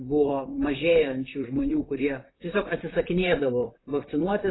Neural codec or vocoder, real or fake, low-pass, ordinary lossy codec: vocoder, 44.1 kHz, 128 mel bands, Pupu-Vocoder; fake; 7.2 kHz; AAC, 16 kbps